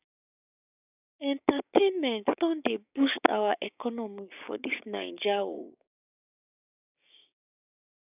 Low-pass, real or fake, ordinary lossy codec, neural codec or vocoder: 3.6 kHz; fake; none; codec, 16 kHz, 6 kbps, DAC